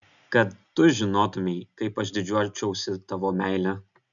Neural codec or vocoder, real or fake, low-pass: none; real; 7.2 kHz